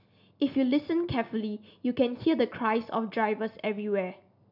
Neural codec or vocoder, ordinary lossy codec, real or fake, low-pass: none; none; real; 5.4 kHz